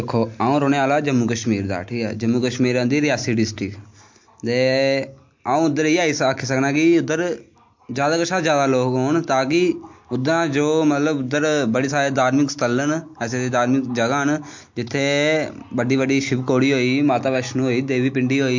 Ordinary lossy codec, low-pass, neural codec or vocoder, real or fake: MP3, 48 kbps; 7.2 kHz; none; real